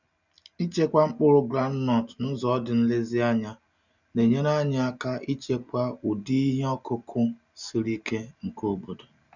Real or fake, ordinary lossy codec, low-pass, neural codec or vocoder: real; none; 7.2 kHz; none